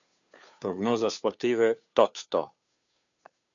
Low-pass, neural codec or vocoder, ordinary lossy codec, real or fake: 7.2 kHz; codec, 16 kHz, 2 kbps, FunCodec, trained on Chinese and English, 25 frames a second; MP3, 96 kbps; fake